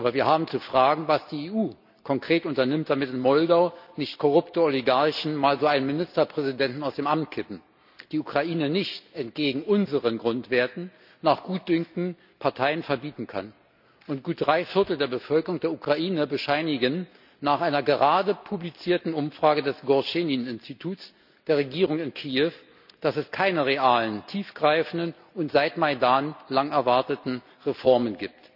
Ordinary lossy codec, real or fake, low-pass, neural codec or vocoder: none; real; 5.4 kHz; none